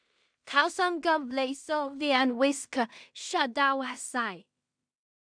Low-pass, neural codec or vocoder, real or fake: 9.9 kHz; codec, 16 kHz in and 24 kHz out, 0.4 kbps, LongCat-Audio-Codec, two codebook decoder; fake